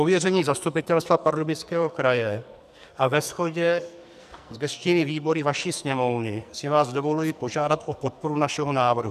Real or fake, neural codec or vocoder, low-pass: fake; codec, 44.1 kHz, 2.6 kbps, SNAC; 14.4 kHz